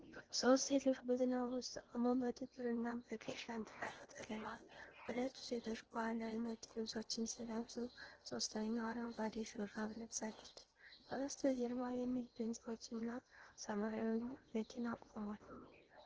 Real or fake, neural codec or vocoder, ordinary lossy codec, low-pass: fake; codec, 16 kHz in and 24 kHz out, 0.8 kbps, FocalCodec, streaming, 65536 codes; Opus, 16 kbps; 7.2 kHz